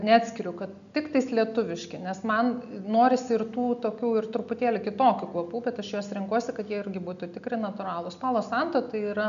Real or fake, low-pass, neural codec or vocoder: real; 7.2 kHz; none